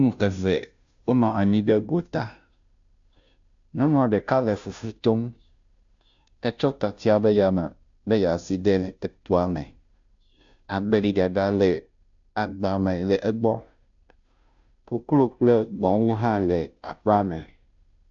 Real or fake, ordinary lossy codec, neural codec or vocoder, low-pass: fake; AAC, 64 kbps; codec, 16 kHz, 0.5 kbps, FunCodec, trained on Chinese and English, 25 frames a second; 7.2 kHz